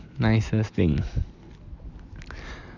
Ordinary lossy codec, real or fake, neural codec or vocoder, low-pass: none; real; none; 7.2 kHz